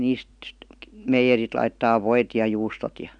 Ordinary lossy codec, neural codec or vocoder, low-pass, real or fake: none; none; 9.9 kHz; real